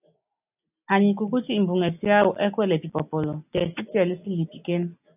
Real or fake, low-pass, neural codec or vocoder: real; 3.6 kHz; none